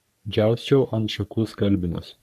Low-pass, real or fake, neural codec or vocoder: 14.4 kHz; fake; codec, 44.1 kHz, 3.4 kbps, Pupu-Codec